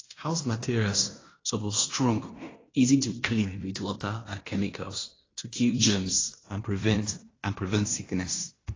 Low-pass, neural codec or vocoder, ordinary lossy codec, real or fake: 7.2 kHz; codec, 16 kHz in and 24 kHz out, 0.9 kbps, LongCat-Audio-Codec, fine tuned four codebook decoder; AAC, 32 kbps; fake